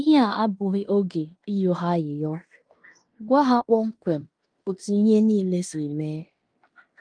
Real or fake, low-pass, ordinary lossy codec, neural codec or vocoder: fake; 9.9 kHz; Opus, 32 kbps; codec, 16 kHz in and 24 kHz out, 0.9 kbps, LongCat-Audio-Codec, fine tuned four codebook decoder